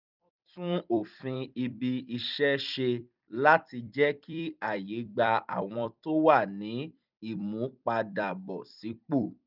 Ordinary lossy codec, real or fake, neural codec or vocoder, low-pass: none; fake; vocoder, 44.1 kHz, 128 mel bands every 256 samples, BigVGAN v2; 5.4 kHz